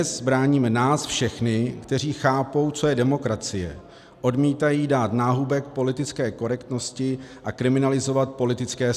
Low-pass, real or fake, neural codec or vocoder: 14.4 kHz; real; none